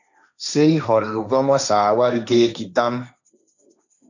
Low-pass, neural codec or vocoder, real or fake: 7.2 kHz; codec, 16 kHz, 1.1 kbps, Voila-Tokenizer; fake